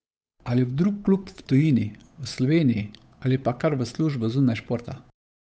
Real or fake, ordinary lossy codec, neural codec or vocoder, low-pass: fake; none; codec, 16 kHz, 8 kbps, FunCodec, trained on Chinese and English, 25 frames a second; none